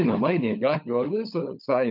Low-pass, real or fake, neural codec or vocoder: 5.4 kHz; fake; codec, 16 kHz, 16 kbps, FunCodec, trained on LibriTTS, 50 frames a second